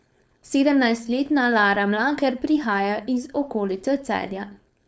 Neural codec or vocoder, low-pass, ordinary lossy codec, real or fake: codec, 16 kHz, 4.8 kbps, FACodec; none; none; fake